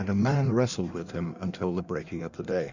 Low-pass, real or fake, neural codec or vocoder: 7.2 kHz; fake; codec, 16 kHz in and 24 kHz out, 1.1 kbps, FireRedTTS-2 codec